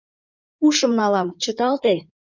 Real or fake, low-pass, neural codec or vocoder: fake; 7.2 kHz; codec, 16 kHz in and 24 kHz out, 2.2 kbps, FireRedTTS-2 codec